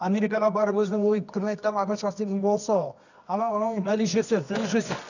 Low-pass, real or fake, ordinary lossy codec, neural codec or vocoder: 7.2 kHz; fake; none; codec, 24 kHz, 0.9 kbps, WavTokenizer, medium music audio release